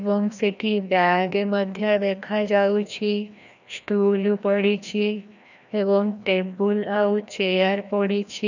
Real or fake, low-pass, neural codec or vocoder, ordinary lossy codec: fake; 7.2 kHz; codec, 16 kHz, 1 kbps, FreqCodec, larger model; none